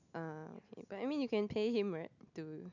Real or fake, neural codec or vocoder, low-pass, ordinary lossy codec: real; none; 7.2 kHz; none